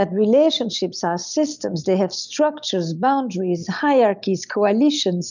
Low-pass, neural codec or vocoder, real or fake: 7.2 kHz; none; real